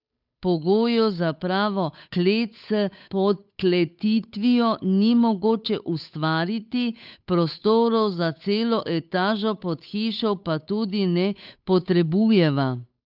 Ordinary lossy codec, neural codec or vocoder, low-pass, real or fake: none; codec, 16 kHz, 8 kbps, FunCodec, trained on Chinese and English, 25 frames a second; 5.4 kHz; fake